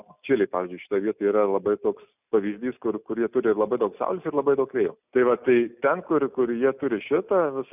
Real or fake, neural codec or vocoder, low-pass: real; none; 3.6 kHz